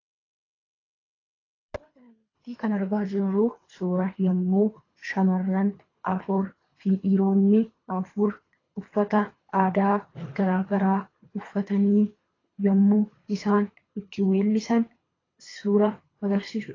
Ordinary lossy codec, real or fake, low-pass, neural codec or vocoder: AAC, 32 kbps; fake; 7.2 kHz; codec, 24 kHz, 3 kbps, HILCodec